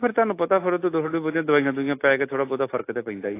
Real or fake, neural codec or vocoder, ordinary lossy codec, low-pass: real; none; AAC, 24 kbps; 3.6 kHz